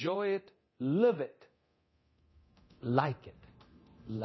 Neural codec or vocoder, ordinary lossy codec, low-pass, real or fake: codec, 24 kHz, 0.9 kbps, DualCodec; MP3, 24 kbps; 7.2 kHz; fake